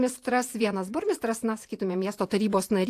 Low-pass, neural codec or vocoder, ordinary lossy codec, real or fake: 14.4 kHz; vocoder, 48 kHz, 128 mel bands, Vocos; AAC, 64 kbps; fake